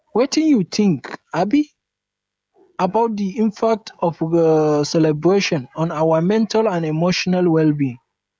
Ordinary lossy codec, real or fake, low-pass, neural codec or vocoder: none; fake; none; codec, 16 kHz, 16 kbps, FreqCodec, smaller model